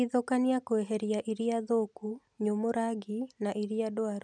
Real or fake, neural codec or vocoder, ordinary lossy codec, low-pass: real; none; none; 9.9 kHz